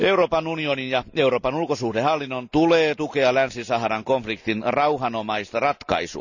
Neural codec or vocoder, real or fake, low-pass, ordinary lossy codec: none; real; 7.2 kHz; none